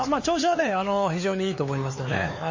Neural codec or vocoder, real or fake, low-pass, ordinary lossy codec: codec, 16 kHz, 4 kbps, X-Codec, HuBERT features, trained on LibriSpeech; fake; 7.2 kHz; MP3, 32 kbps